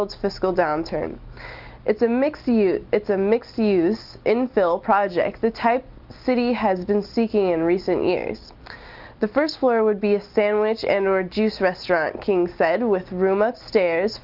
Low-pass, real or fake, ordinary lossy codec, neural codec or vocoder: 5.4 kHz; real; Opus, 24 kbps; none